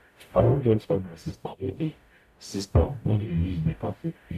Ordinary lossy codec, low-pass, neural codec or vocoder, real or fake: none; 14.4 kHz; codec, 44.1 kHz, 0.9 kbps, DAC; fake